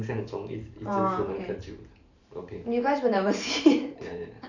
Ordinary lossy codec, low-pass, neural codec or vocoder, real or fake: none; 7.2 kHz; vocoder, 44.1 kHz, 128 mel bands every 512 samples, BigVGAN v2; fake